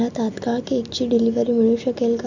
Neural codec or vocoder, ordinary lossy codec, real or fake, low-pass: none; none; real; 7.2 kHz